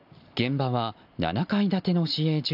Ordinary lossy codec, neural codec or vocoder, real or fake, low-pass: AAC, 48 kbps; none; real; 5.4 kHz